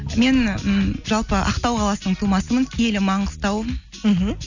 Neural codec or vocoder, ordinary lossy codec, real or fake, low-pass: none; none; real; 7.2 kHz